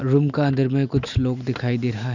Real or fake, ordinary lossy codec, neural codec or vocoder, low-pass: real; none; none; 7.2 kHz